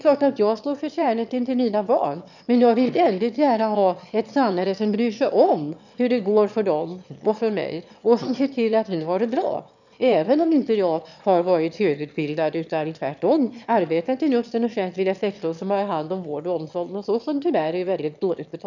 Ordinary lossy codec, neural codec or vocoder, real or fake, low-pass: none; autoencoder, 22.05 kHz, a latent of 192 numbers a frame, VITS, trained on one speaker; fake; 7.2 kHz